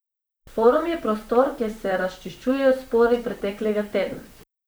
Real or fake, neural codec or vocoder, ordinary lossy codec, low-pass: fake; vocoder, 44.1 kHz, 128 mel bands, Pupu-Vocoder; none; none